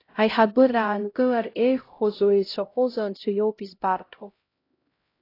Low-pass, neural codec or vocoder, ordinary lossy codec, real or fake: 5.4 kHz; codec, 16 kHz, 0.5 kbps, X-Codec, HuBERT features, trained on LibriSpeech; AAC, 32 kbps; fake